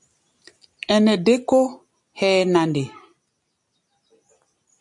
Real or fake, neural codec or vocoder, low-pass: real; none; 10.8 kHz